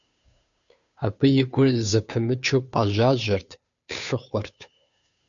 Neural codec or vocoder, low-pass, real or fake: codec, 16 kHz, 2 kbps, FunCodec, trained on Chinese and English, 25 frames a second; 7.2 kHz; fake